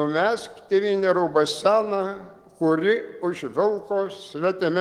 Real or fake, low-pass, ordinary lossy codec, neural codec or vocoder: fake; 19.8 kHz; Opus, 24 kbps; codec, 44.1 kHz, 7.8 kbps, DAC